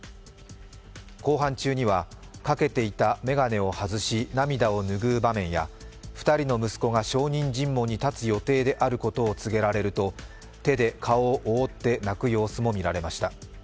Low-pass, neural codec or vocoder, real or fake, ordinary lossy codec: none; none; real; none